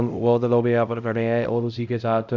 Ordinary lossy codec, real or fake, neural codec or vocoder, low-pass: none; fake; codec, 16 kHz, 0.5 kbps, X-Codec, HuBERT features, trained on LibriSpeech; 7.2 kHz